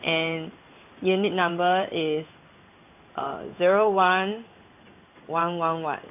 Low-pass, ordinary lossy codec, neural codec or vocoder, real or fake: 3.6 kHz; AAC, 32 kbps; codec, 16 kHz in and 24 kHz out, 1 kbps, XY-Tokenizer; fake